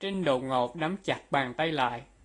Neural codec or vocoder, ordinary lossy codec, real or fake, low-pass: none; AAC, 32 kbps; real; 10.8 kHz